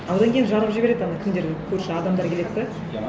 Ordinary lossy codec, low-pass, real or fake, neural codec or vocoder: none; none; real; none